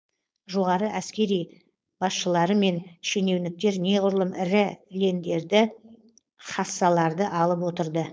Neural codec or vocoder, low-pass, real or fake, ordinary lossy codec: codec, 16 kHz, 4.8 kbps, FACodec; none; fake; none